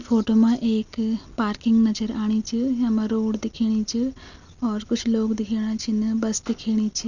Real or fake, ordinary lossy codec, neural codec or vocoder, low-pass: real; none; none; 7.2 kHz